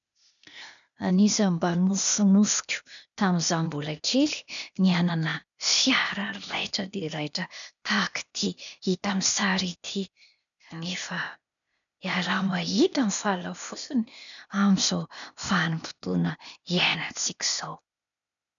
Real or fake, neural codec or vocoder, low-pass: fake; codec, 16 kHz, 0.8 kbps, ZipCodec; 7.2 kHz